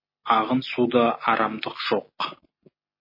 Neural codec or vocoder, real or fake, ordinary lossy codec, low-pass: none; real; MP3, 24 kbps; 5.4 kHz